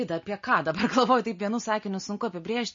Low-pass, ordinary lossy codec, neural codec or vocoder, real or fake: 7.2 kHz; MP3, 32 kbps; none; real